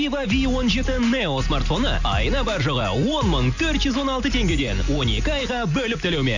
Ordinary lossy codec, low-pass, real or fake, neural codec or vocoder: none; 7.2 kHz; real; none